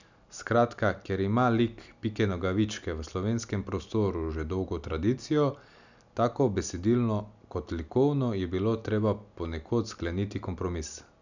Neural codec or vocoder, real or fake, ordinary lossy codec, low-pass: none; real; none; 7.2 kHz